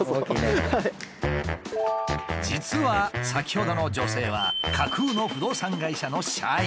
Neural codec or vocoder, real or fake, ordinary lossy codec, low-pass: none; real; none; none